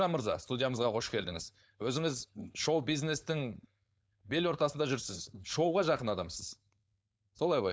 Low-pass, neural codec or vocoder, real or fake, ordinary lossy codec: none; codec, 16 kHz, 4.8 kbps, FACodec; fake; none